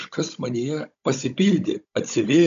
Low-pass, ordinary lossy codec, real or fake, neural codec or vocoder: 7.2 kHz; AAC, 96 kbps; fake; codec, 16 kHz, 16 kbps, FunCodec, trained on Chinese and English, 50 frames a second